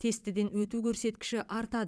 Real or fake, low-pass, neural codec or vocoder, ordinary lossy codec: fake; none; vocoder, 22.05 kHz, 80 mel bands, Vocos; none